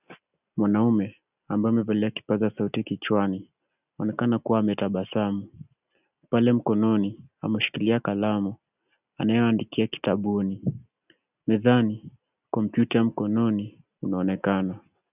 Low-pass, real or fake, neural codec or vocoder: 3.6 kHz; real; none